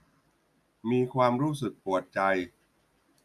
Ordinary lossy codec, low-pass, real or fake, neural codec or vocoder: AAC, 96 kbps; 14.4 kHz; real; none